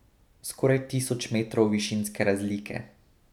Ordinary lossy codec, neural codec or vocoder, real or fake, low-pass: none; none; real; 19.8 kHz